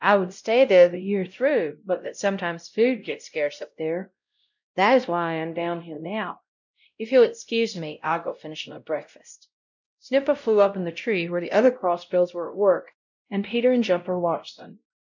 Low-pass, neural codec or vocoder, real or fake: 7.2 kHz; codec, 16 kHz, 0.5 kbps, X-Codec, WavLM features, trained on Multilingual LibriSpeech; fake